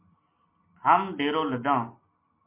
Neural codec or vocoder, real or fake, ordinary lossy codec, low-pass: none; real; MP3, 24 kbps; 3.6 kHz